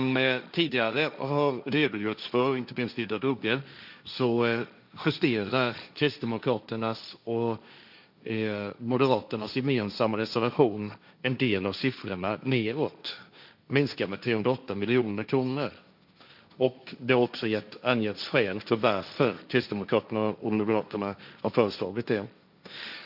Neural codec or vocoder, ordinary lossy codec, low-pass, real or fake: codec, 16 kHz, 1.1 kbps, Voila-Tokenizer; none; 5.4 kHz; fake